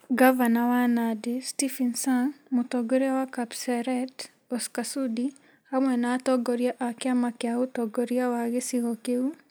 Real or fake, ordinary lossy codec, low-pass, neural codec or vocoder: real; none; none; none